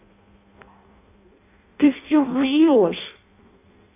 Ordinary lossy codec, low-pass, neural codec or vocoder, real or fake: AAC, 24 kbps; 3.6 kHz; codec, 16 kHz in and 24 kHz out, 0.6 kbps, FireRedTTS-2 codec; fake